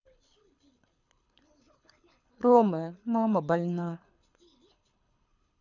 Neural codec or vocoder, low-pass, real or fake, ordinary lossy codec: codec, 24 kHz, 6 kbps, HILCodec; 7.2 kHz; fake; none